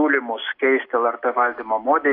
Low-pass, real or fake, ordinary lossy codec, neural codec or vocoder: 5.4 kHz; real; AAC, 32 kbps; none